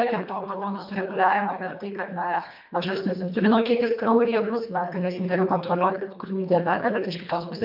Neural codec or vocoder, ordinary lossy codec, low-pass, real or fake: codec, 24 kHz, 1.5 kbps, HILCodec; MP3, 48 kbps; 5.4 kHz; fake